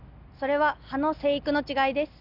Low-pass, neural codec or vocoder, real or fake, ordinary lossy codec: 5.4 kHz; none; real; none